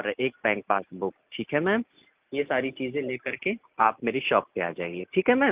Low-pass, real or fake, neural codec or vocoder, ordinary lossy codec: 3.6 kHz; real; none; Opus, 24 kbps